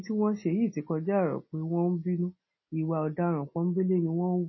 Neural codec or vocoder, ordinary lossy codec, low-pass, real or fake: none; MP3, 24 kbps; 7.2 kHz; real